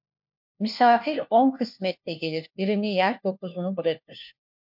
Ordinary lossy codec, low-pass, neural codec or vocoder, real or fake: MP3, 48 kbps; 5.4 kHz; codec, 16 kHz, 1 kbps, FunCodec, trained on LibriTTS, 50 frames a second; fake